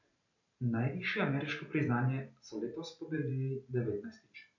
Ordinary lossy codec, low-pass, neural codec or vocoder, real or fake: none; 7.2 kHz; vocoder, 44.1 kHz, 128 mel bands every 512 samples, BigVGAN v2; fake